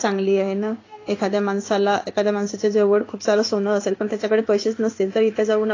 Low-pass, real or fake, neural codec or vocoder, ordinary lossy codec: 7.2 kHz; fake; codec, 16 kHz in and 24 kHz out, 1 kbps, XY-Tokenizer; AAC, 32 kbps